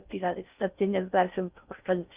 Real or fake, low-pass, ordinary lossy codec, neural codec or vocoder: fake; 3.6 kHz; Opus, 24 kbps; codec, 16 kHz in and 24 kHz out, 0.6 kbps, FocalCodec, streaming, 4096 codes